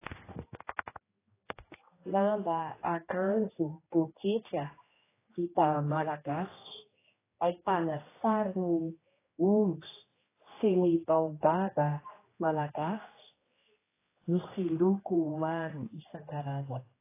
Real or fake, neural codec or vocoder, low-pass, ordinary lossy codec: fake; codec, 16 kHz, 1 kbps, X-Codec, HuBERT features, trained on general audio; 3.6 kHz; AAC, 16 kbps